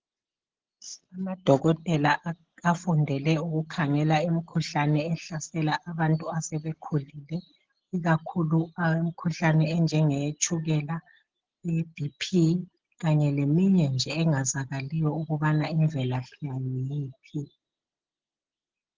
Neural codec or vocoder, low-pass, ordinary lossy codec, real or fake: none; 7.2 kHz; Opus, 16 kbps; real